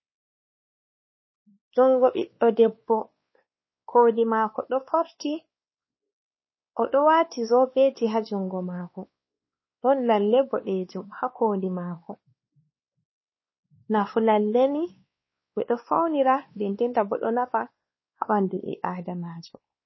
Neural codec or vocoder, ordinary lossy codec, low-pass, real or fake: codec, 16 kHz, 2 kbps, X-Codec, WavLM features, trained on Multilingual LibriSpeech; MP3, 24 kbps; 7.2 kHz; fake